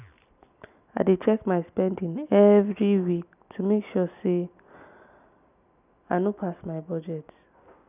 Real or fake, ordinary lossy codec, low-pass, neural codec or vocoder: real; none; 3.6 kHz; none